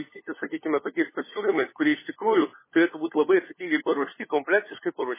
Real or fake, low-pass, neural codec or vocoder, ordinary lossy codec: fake; 3.6 kHz; codec, 16 kHz, 4 kbps, FunCodec, trained on LibriTTS, 50 frames a second; MP3, 16 kbps